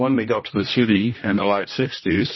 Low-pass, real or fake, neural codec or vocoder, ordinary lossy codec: 7.2 kHz; fake; codec, 16 kHz, 1 kbps, X-Codec, HuBERT features, trained on general audio; MP3, 24 kbps